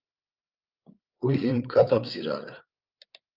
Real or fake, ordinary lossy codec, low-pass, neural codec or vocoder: fake; Opus, 24 kbps; 5.4 kHz; codec, 16 kHz, 8 kbps, FreqCodec, larger model